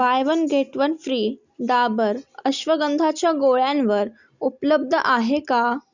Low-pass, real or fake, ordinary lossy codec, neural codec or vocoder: 7.2 kHz; real; Opus, 64 kbps; none